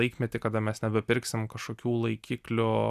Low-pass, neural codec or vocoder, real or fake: 14.4 kHz; none; real